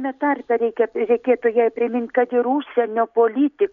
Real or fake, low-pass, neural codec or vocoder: real; 7.2 kHz; none